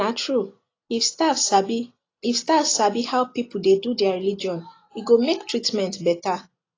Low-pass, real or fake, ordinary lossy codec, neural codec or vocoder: 7.2 kHz; real; AAC, 32 kbps; none